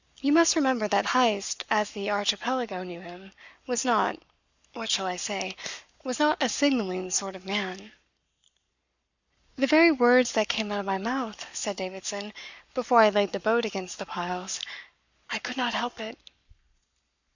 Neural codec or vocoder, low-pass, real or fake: codec, 44.1 kHz, 7.8 kbps, Pupu-Codec; 7.2 kHz; fake